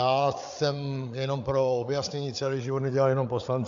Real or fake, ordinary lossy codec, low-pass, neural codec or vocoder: fake; AAC, 64 kbps; 7.2 kHz; codec, 16 kHz, 8 kbps, FreqCodec, larger model